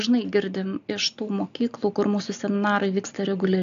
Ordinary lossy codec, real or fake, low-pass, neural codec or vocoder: AAC, 64 kbps; real; 7.2 kHz; none